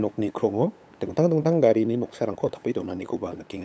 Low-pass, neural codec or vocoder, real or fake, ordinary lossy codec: none; codec, 16 kHz, 8 kbps, FunCodec, trained on LibriTTS, 25 frames a second; fake; none